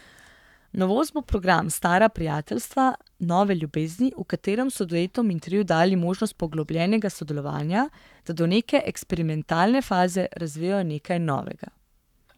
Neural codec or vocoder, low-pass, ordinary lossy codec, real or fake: codec, 44.1 kHz, 7.8 kbps, Pupu-Codec; 19.8 kHz; none; fake